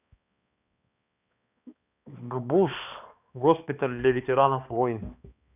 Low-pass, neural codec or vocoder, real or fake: 3.6 kHz; codec, 16 kHz, 2 kbps, X-Codec, HuBERT features, trained on balanced general audio; fake